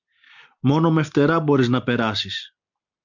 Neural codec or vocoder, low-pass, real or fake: none; 7.2 kHz; real